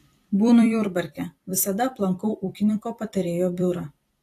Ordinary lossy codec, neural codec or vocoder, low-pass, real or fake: AAC, 48 kbps; vocoder, 44.1 kHz, 128 mel bands every 256 samples, BigVGAN v2; 14.4 kHz; fake